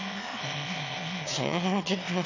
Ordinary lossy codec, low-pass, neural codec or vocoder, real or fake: none; 7.2 kHz; autoencoder, 22.05 kHz, a latent of 192 numbers a frame, VITS, trained on one speaker; fake